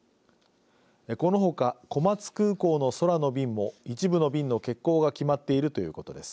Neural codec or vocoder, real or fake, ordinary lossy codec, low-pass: none; real; none; none